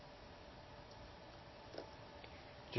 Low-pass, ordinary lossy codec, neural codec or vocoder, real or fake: 7.2 kHz; MP3, 24 kbps; none; real